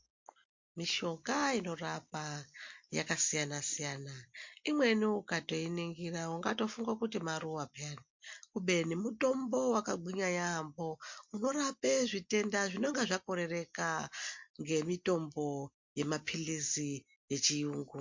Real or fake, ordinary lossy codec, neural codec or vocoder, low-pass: real; MP3, 48 kbps; none; 7.2 kHz